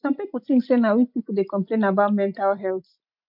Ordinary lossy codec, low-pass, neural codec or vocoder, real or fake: MP3, 48 kbps; 5.4 kHz; none; real